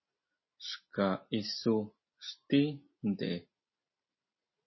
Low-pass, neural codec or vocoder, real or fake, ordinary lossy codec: 7.2 kHz; none; real; MP3, 24 kbps